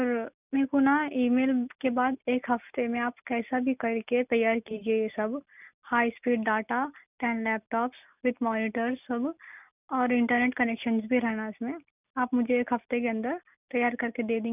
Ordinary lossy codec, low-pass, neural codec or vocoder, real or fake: none; 3.6 kHz; none; real